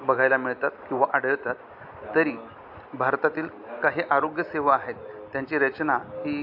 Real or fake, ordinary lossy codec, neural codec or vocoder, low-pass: real; AAC, 48 kbps; none; 5.4 kHz